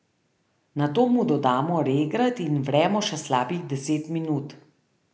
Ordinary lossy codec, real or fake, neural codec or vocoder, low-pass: none; real; none; none